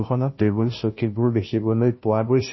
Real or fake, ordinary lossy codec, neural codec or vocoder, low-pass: fake; MP3, 24 kbps; codec, 16 kHz, 0.5 kbps, FunCodec, trained on Chinese and English, 25 frames a second; 7.2 kHz